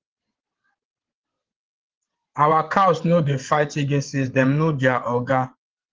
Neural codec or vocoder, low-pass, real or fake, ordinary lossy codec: vocoder, 22.05 kHz, 80 mel bands, WaveNeXt; 7.2 kHz; fake; Opus, 16 kbps